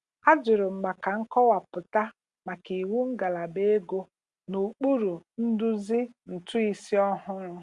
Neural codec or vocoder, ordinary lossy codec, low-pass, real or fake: none; Opus, 64 kbps; 10.8 kHz; real